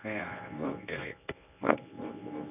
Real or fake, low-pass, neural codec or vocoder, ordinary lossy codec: fake; 3.6 kHz; codec, 24 kHz, 0.9 kbps, WavTokenizer, medium speech release version 1; none